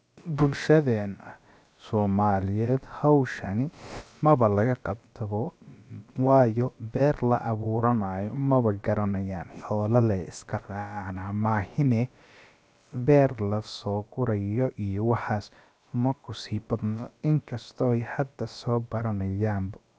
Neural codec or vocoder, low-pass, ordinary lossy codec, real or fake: codec, 16 kHz, about 1 kbps, DyCAST, with the encoder's durations; none; none; fake